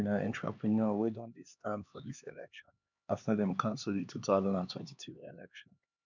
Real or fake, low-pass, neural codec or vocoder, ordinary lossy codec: fake; 7.2 kHz; codec, 16 kHz, 2 kbps, X-Codec, HuBERT features, trained on LibriSpeech; none